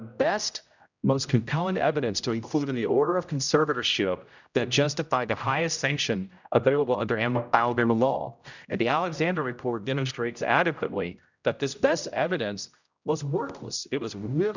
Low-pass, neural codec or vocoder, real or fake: 7.2 kHz; codec, 16 kHz, 0.5 kbps, X-Codec, HuBERT features, trained on general audio; fake